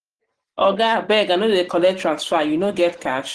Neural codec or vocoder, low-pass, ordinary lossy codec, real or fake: none; 10.8 kHz; Opus, 16 kbps; real